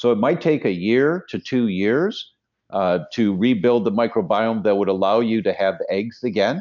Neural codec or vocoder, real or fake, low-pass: none; real; 7.2 kHz